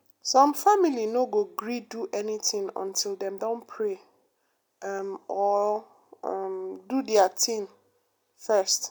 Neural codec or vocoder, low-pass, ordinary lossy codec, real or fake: none; none; none; real